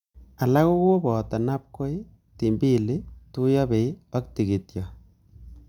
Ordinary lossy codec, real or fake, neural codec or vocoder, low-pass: none; real; none; 19.8 kHz